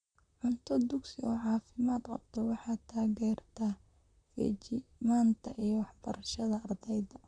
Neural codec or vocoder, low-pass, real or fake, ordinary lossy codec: vocoder, 44.1 kHz, 128 mel bands, Pupu-Vocoder; 9.9 kHz; fake; none